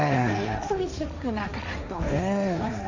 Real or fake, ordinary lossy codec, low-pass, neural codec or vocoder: fake; none; 7.2 kHz; codec, 16 kHz, 1.1 kbps, Voila-Tokenizer